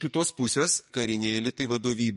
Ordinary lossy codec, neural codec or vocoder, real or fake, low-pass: MP3, 48 kbps; codec, 44.1 kHz, 2.6 kbps, SNAC; fake; 14.4 kHz